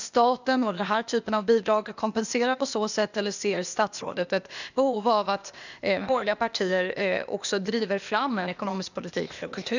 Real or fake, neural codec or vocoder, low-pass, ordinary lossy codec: fake; codec, 16 kHz, 0.8 kbps, ZipCodec; 7.2 kHz; none